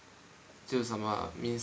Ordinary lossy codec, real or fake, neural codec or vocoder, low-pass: none; real; none; none